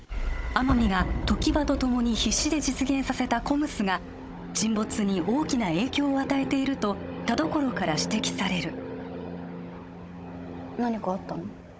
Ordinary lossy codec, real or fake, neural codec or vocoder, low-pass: none; fake; codec, 16 kHz, 16 kbps, FunCodec, trained on Chinese and English, 50 frames a second; none